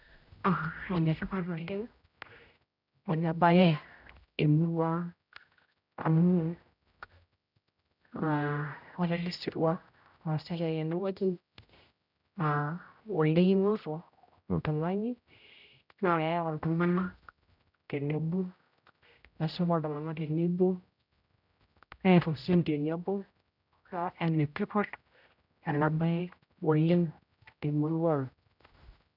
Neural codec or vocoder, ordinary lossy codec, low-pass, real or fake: codec, 16 kHz, 0.5 kbps, X-Codec, HuBERT features, trained on general audio; none; 5.4 kHz; fake